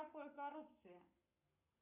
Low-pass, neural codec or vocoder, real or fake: 3.6 kHz; codec, 44.1 kHz, 7.8 kbps, Pupu-Codec; fake